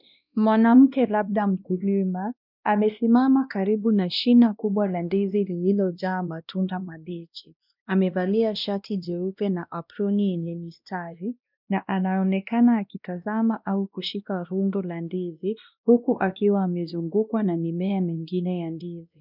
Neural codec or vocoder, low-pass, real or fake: codec, 16 kHz, 1 kbps, X-Codec, WavLM features, trained on Multilingual LibriSpeech; 5.4 kHz; fake